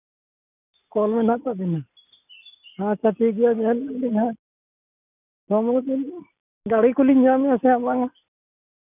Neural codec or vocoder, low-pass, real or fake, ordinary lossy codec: none; 3.6 kHz; real; none